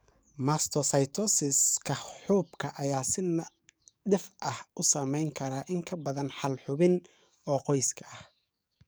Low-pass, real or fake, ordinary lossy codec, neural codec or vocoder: none; fake; none; codec, 44.1 kHz, 7.8 kbps, DAC